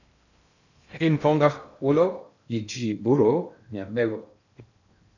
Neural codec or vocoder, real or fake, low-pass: codec, 16 kHz in and 24 kHz out, 0.6 kbps, FocalCodec, streaming, 2048 codes; fake; 7.2 kHz